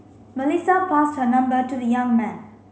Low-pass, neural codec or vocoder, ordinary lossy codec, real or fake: none; none; none; real